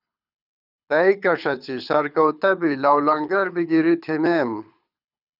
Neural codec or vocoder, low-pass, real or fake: codec, 24 kHz, 6 kbps, HILCodec; 5.4 kHz; fake